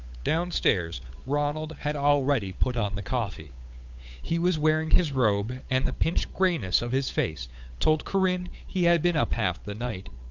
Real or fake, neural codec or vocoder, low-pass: fake; codec, 16 kHz, 8 kbps, FunCodec, trained on Chinese and English, 25 frames a second; 7.2 kHz